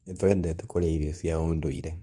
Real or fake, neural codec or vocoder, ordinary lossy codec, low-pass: fake; codec, 24 kHz, 0.9 kbps, WavTokenizer, medium speech release version 2; none; 10.8 kHz